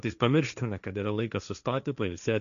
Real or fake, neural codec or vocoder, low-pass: fake; codec, 16 kHz, 1.1 kbps, Voila-Tokenizer; 7.2 kHz